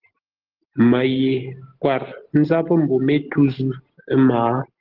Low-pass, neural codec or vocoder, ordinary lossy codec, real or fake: 5.4 kHz; none; Opus, 16 kbps; real